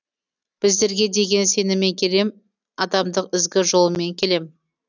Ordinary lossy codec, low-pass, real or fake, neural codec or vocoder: none; 7.2 kHz; real; none